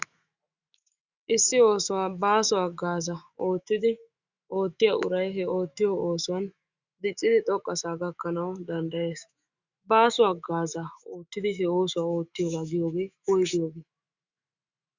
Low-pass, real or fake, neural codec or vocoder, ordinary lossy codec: 7.2 kHz; fake; autoencoder, 48 kHz, 128 numbers a frame, DAC-VAE, trained on Japanese speech; Opus, 64 kbps